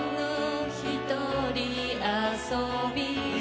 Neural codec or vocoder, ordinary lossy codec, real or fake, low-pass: none; none; real; none